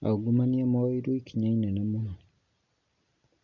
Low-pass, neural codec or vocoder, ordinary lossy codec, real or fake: 7.2 kHz; none; none; real